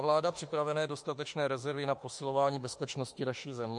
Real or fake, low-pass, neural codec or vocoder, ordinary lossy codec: fake; 10.8 kHz; autoencoder, 48 kHz, 32 numbers a frame, DAC-VAE, trained on Japanese speech; MP3, 48 kbps